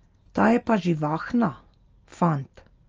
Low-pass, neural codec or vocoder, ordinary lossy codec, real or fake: 7.2 kHz; none; Opus, 24 kbps; real